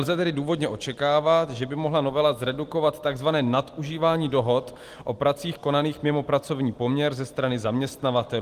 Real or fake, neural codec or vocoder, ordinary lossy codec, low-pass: real; none; Opus, 24 kbps; 14.4 kHz